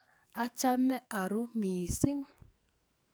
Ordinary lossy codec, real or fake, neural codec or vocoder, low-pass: none; fake; codec, 44.1 kHz, 2.6 kbps, SNAC; none